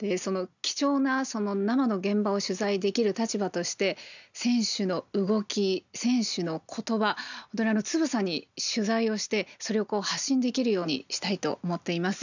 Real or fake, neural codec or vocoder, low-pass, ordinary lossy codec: real; none; 7.2 kHz; none